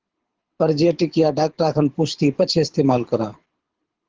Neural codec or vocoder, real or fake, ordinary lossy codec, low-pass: codec, 24 kHz, 6 kbps, HILCodec; fake; Opus, 16 kbps; 7.2 kHz